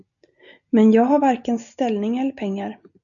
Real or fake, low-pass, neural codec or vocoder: real; 7.2 kHz; none